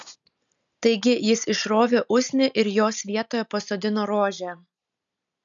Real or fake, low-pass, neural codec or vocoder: real; 7.2 kHz; none